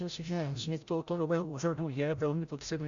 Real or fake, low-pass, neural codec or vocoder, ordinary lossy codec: fake; 7.2 kHz; codec, 16 kHz, 0.5 kbps, FreqCodec, larger model; Opus, 64 kbps